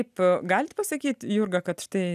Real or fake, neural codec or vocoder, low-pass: real; none; 14.4 kHz